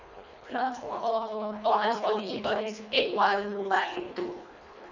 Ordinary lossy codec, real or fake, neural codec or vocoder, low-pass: none; fake; codec, 24 kHz, 1.5 kbps, HILCodec; 7.2 kHz